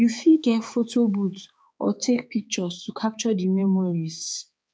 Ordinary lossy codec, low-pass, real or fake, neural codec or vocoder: none; none; fake; codec, 16 kHz, 4 kbps, X-Codec, HuBERT features, trained on balanced general audio